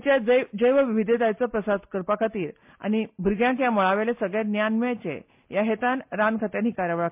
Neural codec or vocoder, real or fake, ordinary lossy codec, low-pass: none; real; MP3, 32 kbps; 3.6 kHz